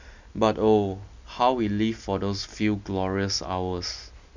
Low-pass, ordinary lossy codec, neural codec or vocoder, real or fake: 7.2 kHz; none; none; real